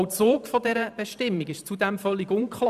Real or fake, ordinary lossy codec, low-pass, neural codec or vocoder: fake; none; 14.4 kHz; vocoder, 44.1 kHz, 128 mel bands every 512 samples, BigVGAN v2